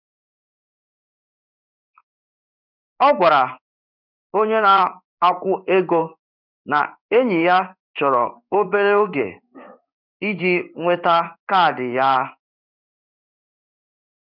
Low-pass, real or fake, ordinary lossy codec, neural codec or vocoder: 5.4 kHz; fake; none; codec, 16 kHz in and 24 kHz out, 1 kbps, XY-Tokenizer